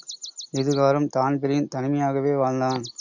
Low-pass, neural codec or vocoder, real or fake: 7.2 kHz; none; real